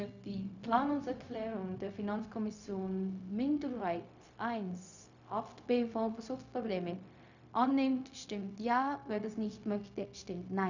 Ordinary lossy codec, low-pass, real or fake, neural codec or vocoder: none; 7.2 kHz; fake; codec, 16 kHz, 0.4 kbps, LongCat-Audio-Codec